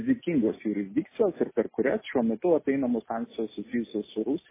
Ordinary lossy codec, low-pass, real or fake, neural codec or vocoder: MP3, 16 kbps; 3.6 kHz; real; none